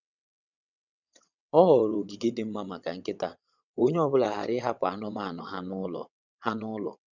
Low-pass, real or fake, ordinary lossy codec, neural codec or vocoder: 7.2 kHz; fake; none; vocoder, 22.05 kHz, 80 mel bands, WaveNeXt